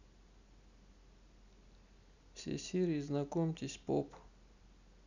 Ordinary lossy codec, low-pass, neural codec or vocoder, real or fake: none; 7.2 kHz; none; real